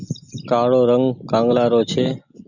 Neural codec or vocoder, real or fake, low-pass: none; real; 7.2 kHz